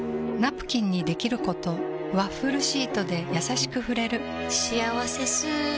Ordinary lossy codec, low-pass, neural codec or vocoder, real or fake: none; none; none; real